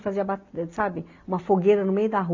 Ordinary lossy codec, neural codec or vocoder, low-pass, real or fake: MP3, 48 kbps; none; 7.2 kHz; real